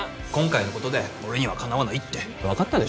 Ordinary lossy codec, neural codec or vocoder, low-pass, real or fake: none; none; none; real